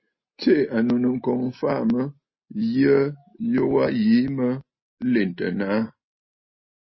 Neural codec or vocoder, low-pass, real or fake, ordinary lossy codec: none; 7.2 kHz; real; MP3, 24 kbps